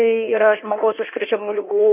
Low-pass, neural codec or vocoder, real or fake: 3.6 kHz; codec, 16 kHz in and 24 kHz out, 1.1 kbps, FireRedTTS-2 codec; fake